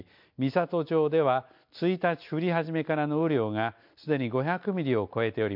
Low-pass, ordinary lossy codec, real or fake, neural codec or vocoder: 5.4 kHz; MP3, 48 kbps; real; none